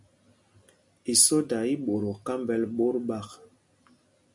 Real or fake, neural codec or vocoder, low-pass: real; none; 10.8 kHz